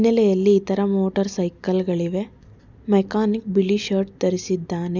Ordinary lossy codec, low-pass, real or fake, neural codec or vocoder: none; 7.2 kHz; real; none